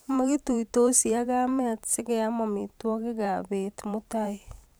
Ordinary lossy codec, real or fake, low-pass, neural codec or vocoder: none; fake; none; vocoder, 44.1 kHz, 128 mel bands every 512 samples, BigVGAN v2